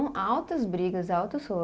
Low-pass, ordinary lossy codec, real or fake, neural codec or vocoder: none; none; real; none